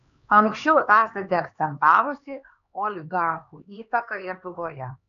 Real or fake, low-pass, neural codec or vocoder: fake; 7.2 kHz; codec, 16 kHz, 2 kbps, X-Codec, HuBERT features, trained on LibriSpeech